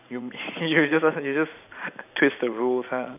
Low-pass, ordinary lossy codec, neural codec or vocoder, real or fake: 3.6 kHz; none; vocoder, 44.1 kHz, 128 mel bands every 512 samples, BigVGAN v2; fake